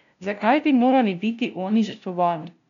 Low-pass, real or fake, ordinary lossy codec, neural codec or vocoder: 7.2 kHz; fake; none; codec, 16 kHz, 0.5 kbps, FunCodec, trained on LibriTTS, 25 frames a second